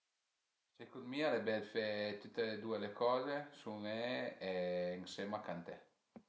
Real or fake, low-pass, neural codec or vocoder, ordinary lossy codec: real; none; none; none